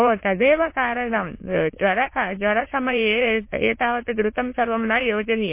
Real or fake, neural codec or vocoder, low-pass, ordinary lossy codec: fake; autoencoder, 22.05 kHz, a latent of 192 numbers a frame, VITS, trained on many speakers; 3.6 kHz; MP3, 24 kbps